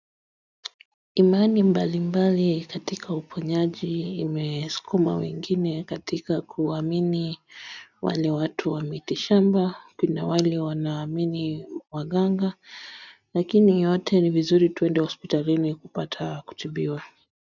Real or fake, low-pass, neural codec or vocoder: real; 7.2 kHz; none